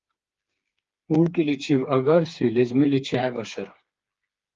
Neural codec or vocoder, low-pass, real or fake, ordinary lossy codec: codec, 16 kHz, 4 kbps, FreqCodec, smaller model; 7.2 kHz; fake; Opus, 32 kbps